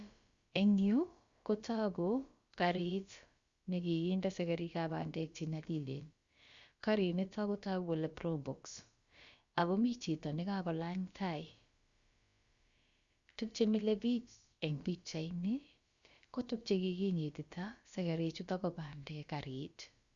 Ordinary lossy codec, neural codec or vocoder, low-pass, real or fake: Opus, 64 kbps; codec, 16 kHz, about 1 kbps, DyCAST, with the encoder's durations; 7.2 kHz; fake